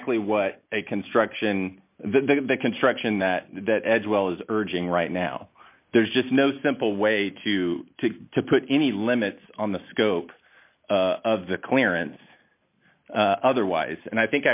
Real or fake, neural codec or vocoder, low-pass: real; none; 3.6 kHz